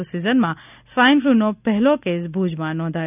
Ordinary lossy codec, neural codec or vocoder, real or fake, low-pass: none; none; real; 3.6 kHz